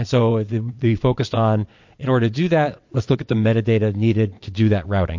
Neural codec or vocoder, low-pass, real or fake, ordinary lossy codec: vocoder, 22.05 kHz, 80 mel bands, WaveNeXt; 7.2 kHz; fake; MP3, 48 kbps